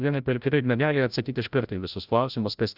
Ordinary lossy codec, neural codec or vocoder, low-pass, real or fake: Opus, 64 kbps; codec, 16 kHz, 0.5 kbps, FreqCodec, larger model; 5.4 kHz; fake